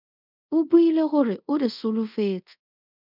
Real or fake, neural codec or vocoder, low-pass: fake; codec, 24 kHz, 0.5 kbps, DualCodec; 5.4 kHz